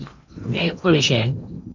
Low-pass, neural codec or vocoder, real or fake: 7.2 kHz; codec, 16 kHz in and 24 kHz out, 0.8 kbps, FocalCodec, streaming, 65536 codes; fake